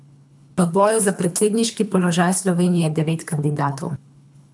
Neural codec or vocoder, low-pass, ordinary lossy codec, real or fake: codec, 24 kHz, 3 kbps, HILCodec; none; none; fake